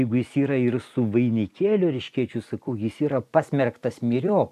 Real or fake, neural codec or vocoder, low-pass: fake; vocoder, 44.1 kHz, 128 mel bands every 256 samples, BigVGAN v2; 14.4 kHz